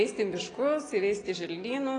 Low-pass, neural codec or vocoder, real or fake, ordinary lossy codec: 9.9 kHz; none; real; AAC, 32 kbps